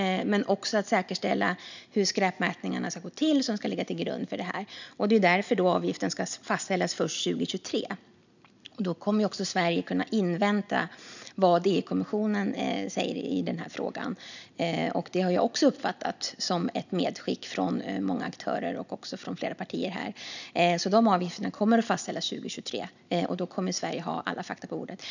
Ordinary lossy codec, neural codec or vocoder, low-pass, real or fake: none; none; 7.2 kHz; real